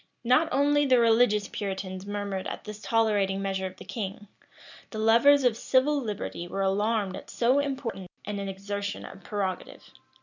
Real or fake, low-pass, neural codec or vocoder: real; 7.2 kHz; none